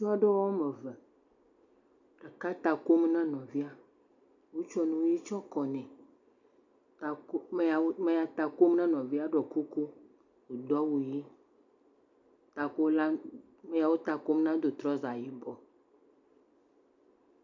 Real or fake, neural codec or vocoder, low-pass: real; none; 7.2 kHz